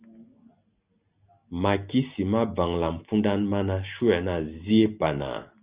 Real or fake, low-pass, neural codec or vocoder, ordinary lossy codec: real; 3.6 kHz; none; Opus, 64 kbps